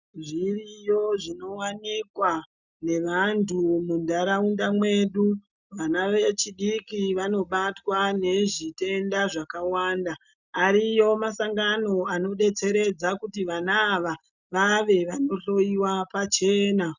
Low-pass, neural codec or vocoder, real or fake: 7.2 kHz; none; real